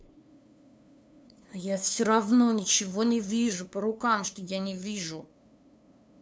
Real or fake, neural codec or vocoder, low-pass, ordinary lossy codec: fake; codec, 16 kHz, 2 kbps, FunCodec, trained on LibriTTS, 25 frames a second; none; none